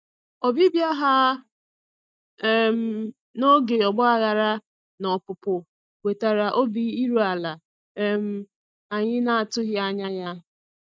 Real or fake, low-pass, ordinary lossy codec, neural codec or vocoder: real; none; none; none